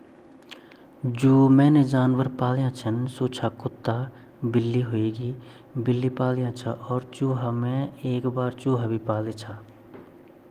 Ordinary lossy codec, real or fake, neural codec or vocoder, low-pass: Opus, 24 kbps; real; none; 14.4 kHz